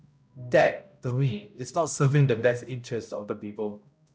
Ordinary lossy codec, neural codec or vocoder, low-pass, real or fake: none; codec, 16 kHz, 0.5 kbps, X-Codec, HuBERT features, trained on balanced general audio; none; fake